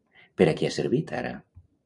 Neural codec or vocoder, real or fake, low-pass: vocoder, 44.1 kHz, 128 mel bands every 512 samples, BigVGAN v2; fake; 10.8 kHz